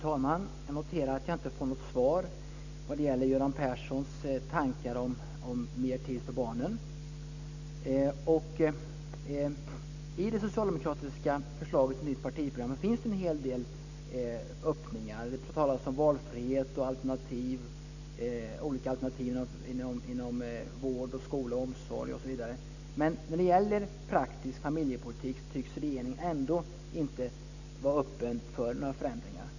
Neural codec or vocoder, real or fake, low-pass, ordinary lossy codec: none; real; 7.2 kHz; none